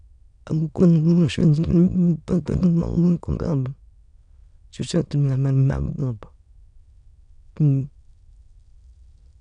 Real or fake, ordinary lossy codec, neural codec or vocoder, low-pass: fake; none; autoencoder, 22.05 kHz, a latent of 192 numbers a frame, VITS, trained on many speakers; 9.9 kHz